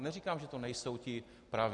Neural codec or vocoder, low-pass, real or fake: none; 10.8 kHz; real